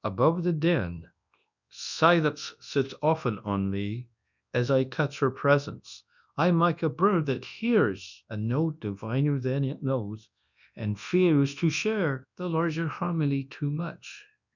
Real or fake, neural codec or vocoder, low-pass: fake; codec, 24 kHz, 0.9 kbps, WavTokenizer, large speech release; 7.2 kHz